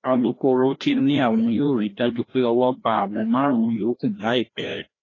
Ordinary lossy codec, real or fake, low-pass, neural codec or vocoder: AAC, 32 kbps; fake; 7.2 kHz; codec, 16 kHz, 1 kbps, FreqCodec, larger model